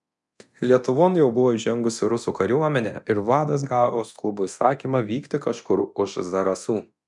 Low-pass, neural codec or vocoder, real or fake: 10.8 kHz; codec, 24 kHz, 0.9 kbps, DualCodec; fake